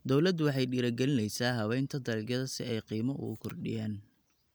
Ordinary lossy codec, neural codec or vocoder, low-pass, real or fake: none; none; none; real